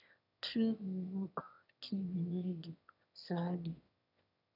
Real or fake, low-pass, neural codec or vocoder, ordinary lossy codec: fake; 5.4 kHz; autoencoder, 22.05 kHz, a latent of 192 numbers a frame, VITS, trained on one speaker; none